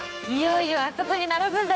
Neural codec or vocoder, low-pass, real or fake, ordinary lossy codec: codec, 16 kHz, 4 kbps, X-Codec, HuBERT features, trained on general audio; none; fake; none